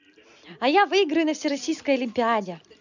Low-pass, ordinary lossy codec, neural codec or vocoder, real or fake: 7.2 kHz; none; none; real